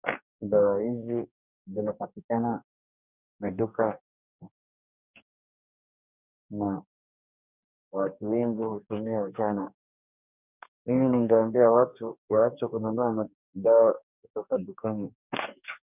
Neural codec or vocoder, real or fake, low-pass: codec, 44.1 kHz, 2.6 kbps, DAC; fake; 3.6 kHz